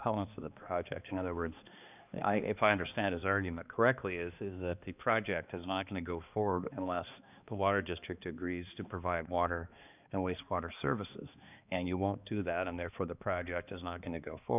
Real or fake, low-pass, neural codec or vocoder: fake; 3.6 kHz; codec, 16 kHz, 2 kbps, X-Codec, HuBERT features, trained on balanced general audio